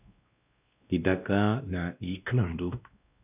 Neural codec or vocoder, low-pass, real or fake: codec, 16 kHz, 1 kbps, X-Codec, WavLM features, trained on Multilingual LibriSpeech; 3.6 kHz; fake